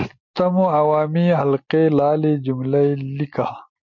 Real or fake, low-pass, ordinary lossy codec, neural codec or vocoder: real; 7.2 kHz; MP3, 48 kbps; none